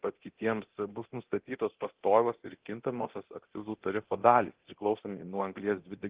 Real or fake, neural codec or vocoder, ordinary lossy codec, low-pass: fake; codec, 24 kHz, 0.9 kbps, DualCodec; Opus, 16 kbps; 3.6 kHz